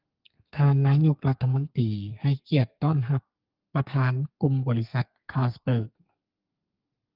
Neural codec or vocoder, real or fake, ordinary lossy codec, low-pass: codec, 32 kHz, 1.9 kbps, SNAC; fake; Opus, 32 kbps; 5.4 kHz